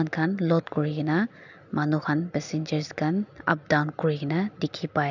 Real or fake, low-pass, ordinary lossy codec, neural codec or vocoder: real; 7.2 kHz; none; none